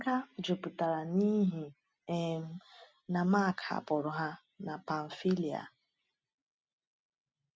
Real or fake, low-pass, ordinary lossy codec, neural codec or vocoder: real; none; none; none